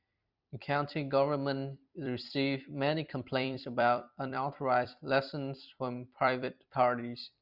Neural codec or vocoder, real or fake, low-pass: vocoder, 44.1 kHz, 128 mel bands every 256 samples, BigVGAN v2; fake; 5.4 kHz